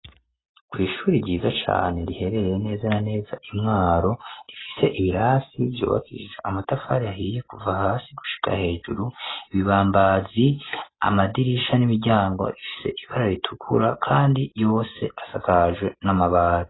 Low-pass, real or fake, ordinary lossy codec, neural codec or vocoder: 7.2 kHz; real; AAC, 16 kbps; none